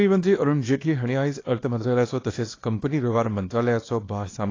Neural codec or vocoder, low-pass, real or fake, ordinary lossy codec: codec, 24 kHz, 0.9 kbps, WavTokenizer, small release; 7.2 kHz; fake; AAC, 32 kbps